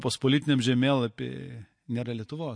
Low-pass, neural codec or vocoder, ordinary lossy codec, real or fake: 9.9 kHz; none; MP3, 48 kbps; real